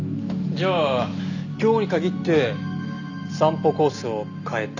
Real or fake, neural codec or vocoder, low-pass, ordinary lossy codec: real; none; 7.2 kHz; none